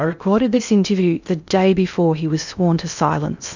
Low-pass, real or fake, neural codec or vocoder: 7.2 kHz; fake; codec, 16 kHz in and 24 kHz out, 0.6 kbps, FocalCodec, streaming, 2048 codes